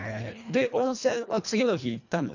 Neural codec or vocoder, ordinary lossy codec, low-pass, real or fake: codec, 24 kHz, 1.5 kbps, HILCodec; none; 7.2 kHz; fake